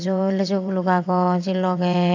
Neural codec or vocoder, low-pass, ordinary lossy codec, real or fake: vocoder, 22.05 kHz, 80 mel bands, WaveNeXt; 7.2 kHz; AAC, 48 kbps; fake